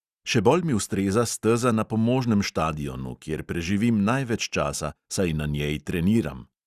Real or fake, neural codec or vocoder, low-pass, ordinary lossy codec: real; none; 14.4 kHz; Opus, 64 kbps